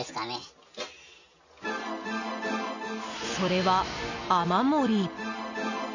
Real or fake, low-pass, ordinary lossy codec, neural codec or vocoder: real; 7.2 kHz; none; none